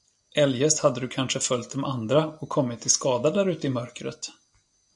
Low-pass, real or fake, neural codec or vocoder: 10.8 kHz; real; none